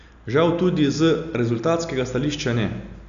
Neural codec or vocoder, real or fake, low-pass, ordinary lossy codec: none; real; 7.2 kHz; none